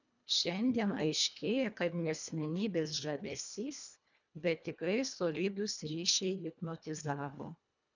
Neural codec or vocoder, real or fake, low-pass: codec, 24 kHz, 1.5 kbps, HILCodec; fake; 7.2 kHz